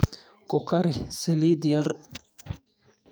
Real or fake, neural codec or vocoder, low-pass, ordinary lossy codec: fake; codec, 44.1 kHz, 2.6 kbps, SNAC; none; none